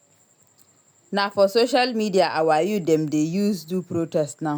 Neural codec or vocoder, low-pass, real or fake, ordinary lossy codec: none; none; real; none